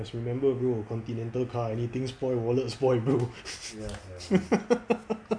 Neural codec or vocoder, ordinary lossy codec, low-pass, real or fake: none; none; 9.9 kHz; real